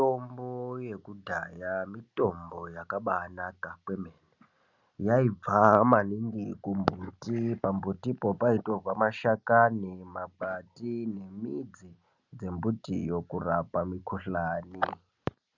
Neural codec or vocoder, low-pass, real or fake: none; 7.2 kHz; real